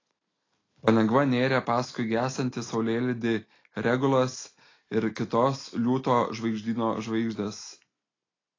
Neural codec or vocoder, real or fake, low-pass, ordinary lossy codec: none; real; 7.2 kHz; AAC, 32 kbps